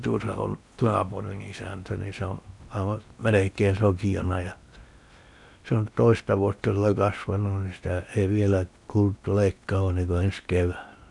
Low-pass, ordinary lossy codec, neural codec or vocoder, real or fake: 10.8 kHz; none; codec, 16 kHz in and 24 kHz out, 0.8 kbps, FocalCodec, streaming, 65536 codes; fake